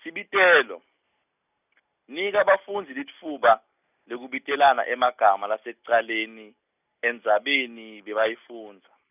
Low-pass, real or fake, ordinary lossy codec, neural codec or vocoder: 3.6 kHz; real; none; none